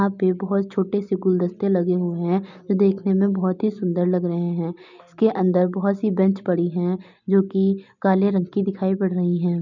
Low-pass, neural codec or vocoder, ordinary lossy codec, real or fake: 7.2 kHz; none; none; real